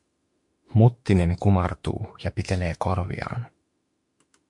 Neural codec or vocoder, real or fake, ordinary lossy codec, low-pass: autoencoder, 48 kHz, 32 numbers a frame, DAC-VAE, trained on Japanese speech; fake; MP3, 64 kbps; 10.8 kHz